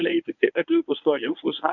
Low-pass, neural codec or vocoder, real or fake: 7.2 kHz; codec, 24 kHz, 0.9 kbps, WavTokenizer, medium speech release version 1; fake